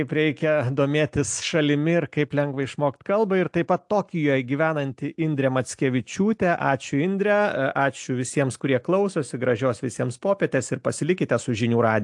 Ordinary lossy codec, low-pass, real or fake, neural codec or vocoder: AAC, 64 kbps; 10.8 kHz; real; none